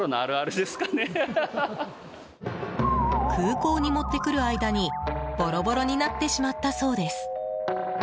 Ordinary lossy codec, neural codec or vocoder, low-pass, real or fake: none; none; none; real